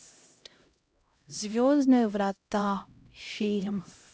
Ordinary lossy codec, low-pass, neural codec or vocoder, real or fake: none; none; codec, 16 kHz, 0.5 kbps, X-Codec, HuBERT features, trained on LibriSpeech; fake